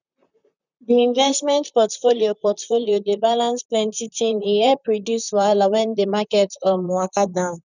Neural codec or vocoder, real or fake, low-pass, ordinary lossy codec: vocoder, 44.1 kHz, 128 mel bands, Pupu-Vocoder; fake; 7.2 kHz; none